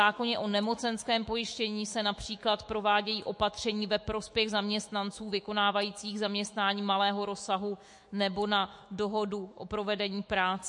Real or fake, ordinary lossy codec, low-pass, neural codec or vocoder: fake; MP3, 48 kbps; 10.8 kHz; autoencoder, 48 kHz, 128 numbers a frame, DAC-VAE, trained on Japanese speech